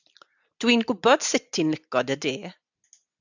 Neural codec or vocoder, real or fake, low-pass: none; real; 7.2 kHz